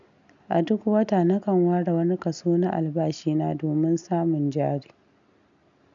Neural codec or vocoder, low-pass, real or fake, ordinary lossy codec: none; 7.2 kHz; real; none